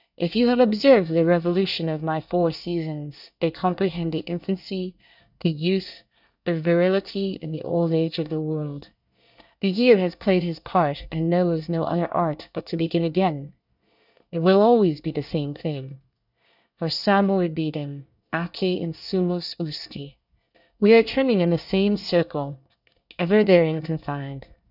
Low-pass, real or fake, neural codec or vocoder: 5.4 kHz; fake; codec, 24 kHz, 1 kbps, SNAC